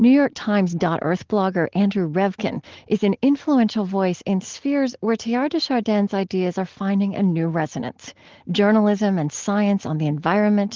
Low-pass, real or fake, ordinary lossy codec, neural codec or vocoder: 7.2 kHz; real; Opus, 16 kbps; none